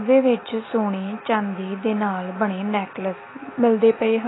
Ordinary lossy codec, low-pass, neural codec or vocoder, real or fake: AAC, 16 kbps; 7.2 kHz; none; real